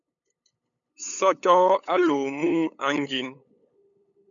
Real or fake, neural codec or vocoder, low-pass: fake; codec, 16 kHz, 8 kbps, FunCodec, trained on LibriTTS, 25 frames a second; 7.2 kHz